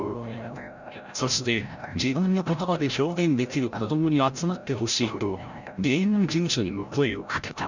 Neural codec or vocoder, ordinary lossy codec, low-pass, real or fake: codec, 16 kHz, 0.5 kbps, FreqCodec, larger model; none; 7.2 kHz; fake